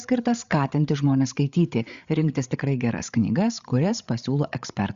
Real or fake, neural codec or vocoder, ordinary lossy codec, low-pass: fake; codec, 16 kHz, 8 kbps, FreqCodec, larger model; Opus, 64 kbps; 7.2 kHz